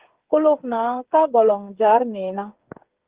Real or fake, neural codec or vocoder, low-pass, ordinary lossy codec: fake; codec, 16 kHz, 8 kbps, FreqCodec, smaller model; 3.6 kHz; Opus, 16 kbps